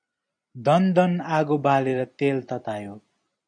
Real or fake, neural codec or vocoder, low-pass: fake; vocoder, 44.1 kHz, 128 mel bands every 256 samples, BigVGAN v2; 9.9 kHz